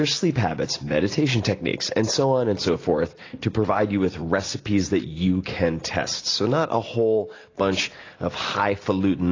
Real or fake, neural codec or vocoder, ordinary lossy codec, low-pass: real; none; AAC, 32 kbps; 7.2 kHz